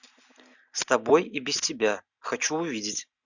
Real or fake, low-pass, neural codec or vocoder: real; 7.2 kHz; none